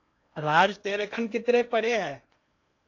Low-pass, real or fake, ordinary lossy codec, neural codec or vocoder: 7.2 kHz; fake; AAC, 48 kbps; codec, 16 kHz in and 24 kHz out, 0.8 kbps, FocalCodec, streaming, 65536 codes